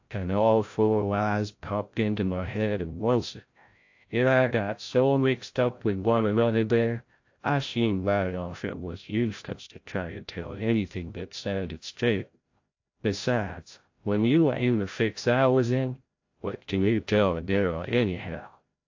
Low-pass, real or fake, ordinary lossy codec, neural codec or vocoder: 7.2 kHz; fake; AAC, 48 kbps; codec, 16 kHz, 0.5 kbps, FreqCodec, larger model